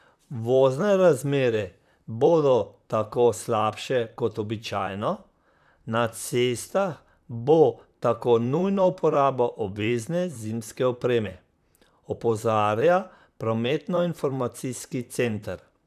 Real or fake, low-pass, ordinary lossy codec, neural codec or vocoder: fake; 14.4 kHz; none; vocoder, 44.1 kHz, 128 mel bands, Pupu-Vocoder